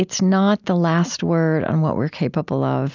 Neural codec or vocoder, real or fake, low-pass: none; real; 7.2 kHz